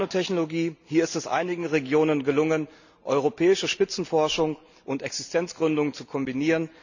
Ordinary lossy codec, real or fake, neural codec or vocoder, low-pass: none; real; none; 7.2 kHz